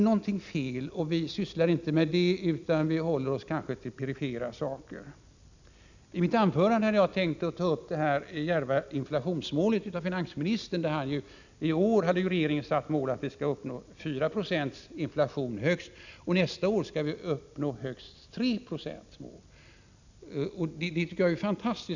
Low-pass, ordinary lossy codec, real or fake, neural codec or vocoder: 7.2 kHz; none; real; none